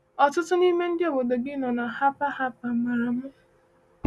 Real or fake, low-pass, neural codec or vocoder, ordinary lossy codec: real; none; none; none